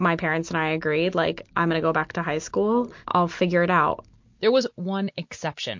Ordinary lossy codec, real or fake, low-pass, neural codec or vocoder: MP3, 48 kbps; real; 7.2 kHz; none